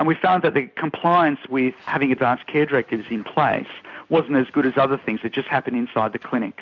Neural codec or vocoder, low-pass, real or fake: none; 7.2 kHz; real